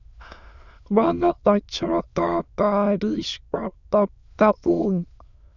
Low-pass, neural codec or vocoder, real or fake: 7.2 kHz; autoencoder, 22.05 kHz, a latent of 192 numbers a frame, VITS, trained on many speakers; fake